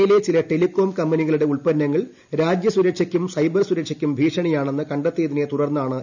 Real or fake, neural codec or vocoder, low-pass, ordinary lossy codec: real; none; 7.2 kHz; none